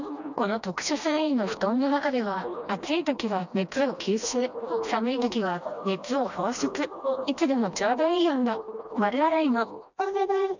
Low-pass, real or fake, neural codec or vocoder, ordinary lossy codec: 7.2 kHz; fake; codec, 16 kHz, 1 kbps, FreqCodec, smaller model; none